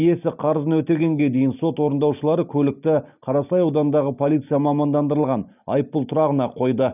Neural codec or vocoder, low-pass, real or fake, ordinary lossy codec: none; 3.6 kHz; real; none